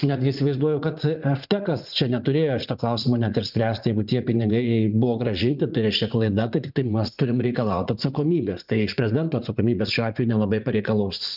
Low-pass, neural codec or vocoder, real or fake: 5.4 kHz; vocoder, 44.1 kHz, 80 mel bands, Vocos; fake